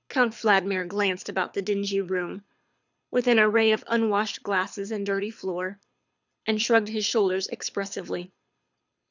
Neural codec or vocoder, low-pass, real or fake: codec, 24 kHz, 6 kbps, HILCodec; 7.2 kHz; fake